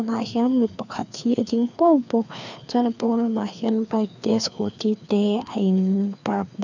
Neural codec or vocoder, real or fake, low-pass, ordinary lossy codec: codec, 16 kHz, 2 kbps, FreqCodec, larger model; fake; 7.2 kHz; none